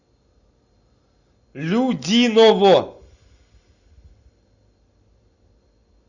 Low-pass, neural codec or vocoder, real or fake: 7.2 kHz; none; real